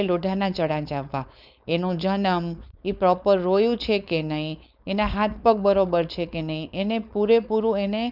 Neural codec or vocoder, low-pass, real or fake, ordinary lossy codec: codec, 16 kHz, 4.8 kbps, FACodec; 5.4 kHz; fake; none